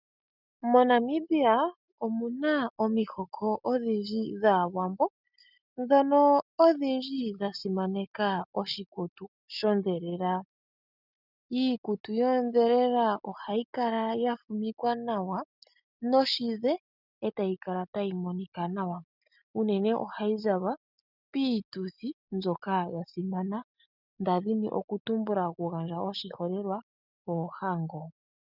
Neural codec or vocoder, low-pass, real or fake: none; 5.4 kHz; real